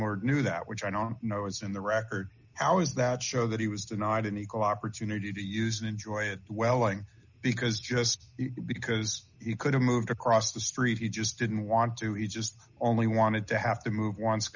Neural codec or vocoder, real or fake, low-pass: none; real; 7.2 kHz